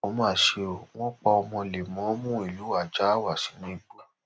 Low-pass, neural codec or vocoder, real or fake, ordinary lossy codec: none; none; real; none